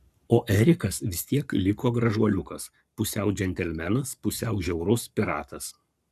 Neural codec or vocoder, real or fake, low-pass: codec, 44.1 kHz, 7.8 kbps, Pupu-Codec; fake; 14.4 kHz